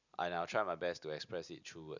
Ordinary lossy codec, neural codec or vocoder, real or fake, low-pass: none; none; real; 7.2 kHz